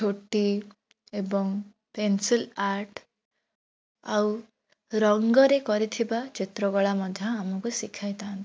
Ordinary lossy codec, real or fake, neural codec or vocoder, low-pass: none; real; none; none